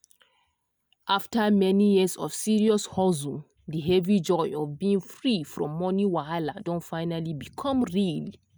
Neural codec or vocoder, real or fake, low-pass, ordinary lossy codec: none; real; none; none